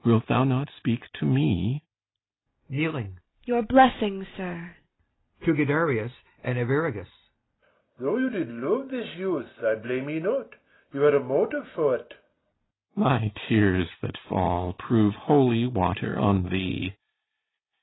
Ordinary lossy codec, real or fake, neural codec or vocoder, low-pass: AAC, 16 kbps; real; none; 7.2 kHz